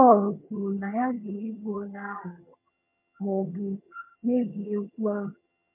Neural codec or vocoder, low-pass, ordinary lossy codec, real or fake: vocoder, 22.05 kHz, 80 mel bands, HiFi-GAN; 3.6 kHz; MP3, 32 kbps; fake